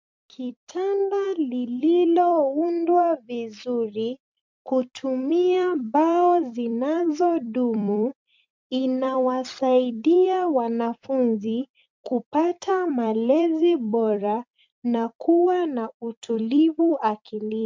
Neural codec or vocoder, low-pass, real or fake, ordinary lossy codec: vocoder, 44.1 kHz, 128 mel bands every 256 samples, BigVGAN v2; 7.2 kHz; fake; MP3, 64 kbps